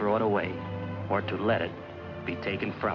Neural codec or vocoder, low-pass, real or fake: none; 7.2 kHz; real